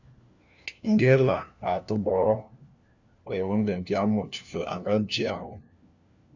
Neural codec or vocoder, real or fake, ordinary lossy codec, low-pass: codec, 16 kHz, 1 kbps, FunCodec, trained on LibriTTS, 50 frames a second; fake; Opus, 64 kbps; 7.2 kHz